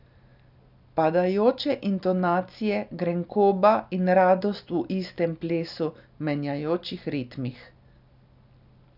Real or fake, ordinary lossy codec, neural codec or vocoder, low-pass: real; none; none; 5.4 kHz